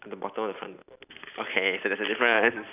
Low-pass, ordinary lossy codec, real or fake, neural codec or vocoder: 3.6 kHz; none; real; none